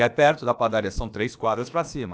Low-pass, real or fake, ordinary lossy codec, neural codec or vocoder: none; fake; none; codec, 16 kHz, about 1 kbps, DyCAST, with the encoder's durations